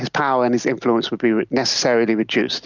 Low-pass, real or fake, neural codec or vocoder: 7.2 kHz; real; none